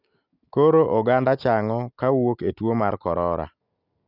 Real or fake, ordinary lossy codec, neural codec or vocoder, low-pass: real; none; none; 5.4 kHz